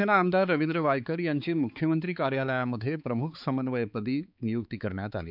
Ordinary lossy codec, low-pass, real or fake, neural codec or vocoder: none; 5.4 kHz; fake; codec, 16 kHz, 4 kbps, X-Codec, HuBERT features, trained on balanced general audio